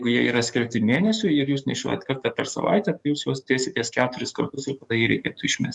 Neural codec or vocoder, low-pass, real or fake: codec, 44.1 kHz, 7.8 kbps, DAC; 10.8 kHz; fake